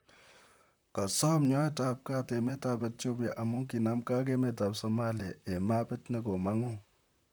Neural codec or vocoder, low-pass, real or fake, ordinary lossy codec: vocoder, 44.1 kHz, 128 mel bands, Pupu-Vocoder; none; fake; none